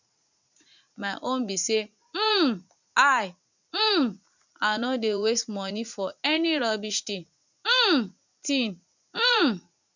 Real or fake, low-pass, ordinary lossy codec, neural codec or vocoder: fake; 7.2 kHz; none; vocoder, 44.1 kHz, 80 mel bands, Vocos